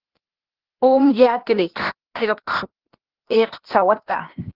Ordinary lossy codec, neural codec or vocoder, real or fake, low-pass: Opus, 16 kbps; codec, 16 kHz, 0.8 kbps, ZipCodec; fake; 5.4 kHz